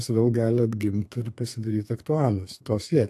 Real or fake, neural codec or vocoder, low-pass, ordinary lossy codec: fake; codec, 32 kHz, 1.9 kbps, SNAC; 14.4 kHz; AAC, 64 kbps